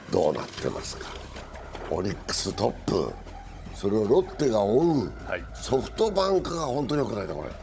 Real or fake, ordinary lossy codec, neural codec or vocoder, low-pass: fake; none; codec, 16 kHz, 16 kbps, FunCodec, trained on Chinese and English, 50 frames a second; none